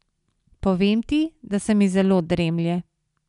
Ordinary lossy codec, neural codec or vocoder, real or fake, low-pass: none; none; real; 10.8 kHz